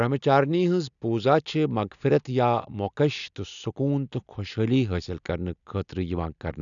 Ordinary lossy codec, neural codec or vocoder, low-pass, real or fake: none; none; 7.2 kHz; real